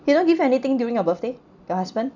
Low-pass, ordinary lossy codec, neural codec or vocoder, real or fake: 7.2 kHz; none; none; real